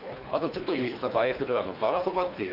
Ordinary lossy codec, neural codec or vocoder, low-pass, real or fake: none; codec, 24 kHz, 3 kbps, HILCodec; 5.4 kHz; fake